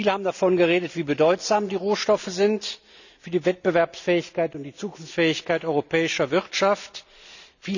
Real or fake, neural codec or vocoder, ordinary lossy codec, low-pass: real; none; none; 7.2 kHz